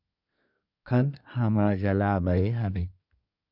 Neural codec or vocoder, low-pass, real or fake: codec, 24 kHz, 1 kbps, SNAC; 5.4 kHz; fake